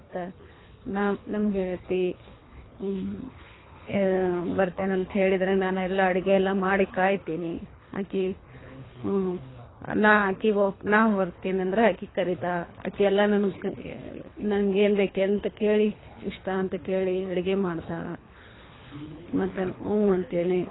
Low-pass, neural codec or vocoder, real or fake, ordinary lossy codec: 7.2 kHz; codec, 24 kHz, 3 kbps, HILCodec; fake; AAC, 16 kbps